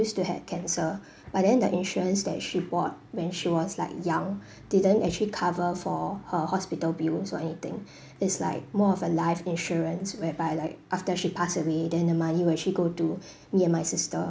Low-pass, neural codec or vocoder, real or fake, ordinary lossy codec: none; none; real; none